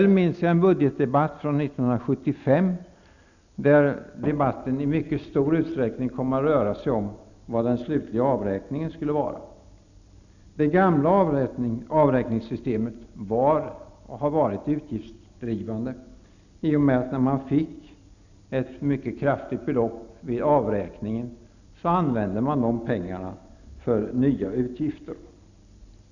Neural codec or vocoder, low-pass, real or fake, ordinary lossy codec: none; 7.2 kHz; real; none